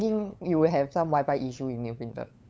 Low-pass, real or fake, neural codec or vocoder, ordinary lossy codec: none; fake; codec, 16 kHz, 8 kbps, FunCodec, trained on LibriTTS, 25 frames a second; none